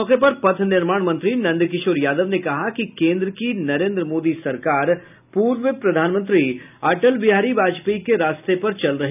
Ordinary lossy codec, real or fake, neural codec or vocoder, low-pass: none; real; none; 3.6 kHz